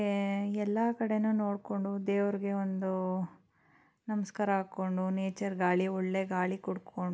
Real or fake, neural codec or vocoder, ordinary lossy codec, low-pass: real; none; none; none